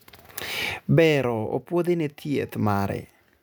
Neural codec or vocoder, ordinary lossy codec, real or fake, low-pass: none; none; real; none